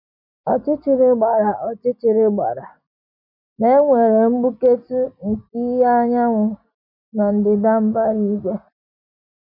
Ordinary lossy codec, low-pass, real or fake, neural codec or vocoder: none; 5.4 kHz; real; none